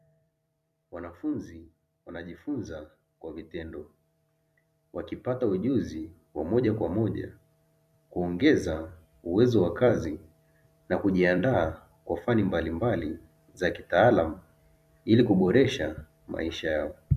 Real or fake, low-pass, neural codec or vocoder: fake; 14.4 kHz; vocoder, 44.1 kHz, 128 mel bands every 256 samples, BigVGAN v2